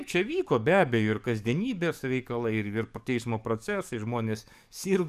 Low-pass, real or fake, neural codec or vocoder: 14.4 kHz; fake; codec, 44.1 kHz, 7.8 kbps, DAC